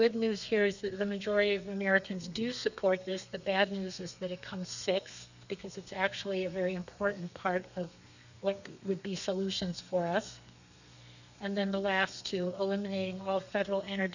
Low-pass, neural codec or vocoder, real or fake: 7.2 kHz; codec, 32 kHz, 1.9 kbps, SNAC; fake